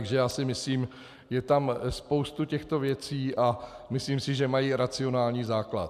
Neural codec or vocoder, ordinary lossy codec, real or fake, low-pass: none; MP3, 96 kbps; real; 14.4 kHz